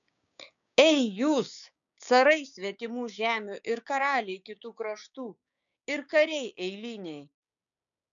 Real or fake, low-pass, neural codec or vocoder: fake; 7.2 kHz; codec, 16 kHz, 6 kbps, DAC